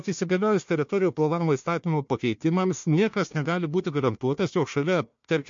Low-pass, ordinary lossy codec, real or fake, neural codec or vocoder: 7.2 kHz; MP3, 48 kbps; fake; codec, 16 kHz, 1 kbps, FunCodec, trained on Chinese and English, 50 frames a second